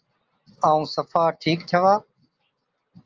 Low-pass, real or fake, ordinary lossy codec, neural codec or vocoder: 7.2 kHz; real; Opus, 24 kbps; none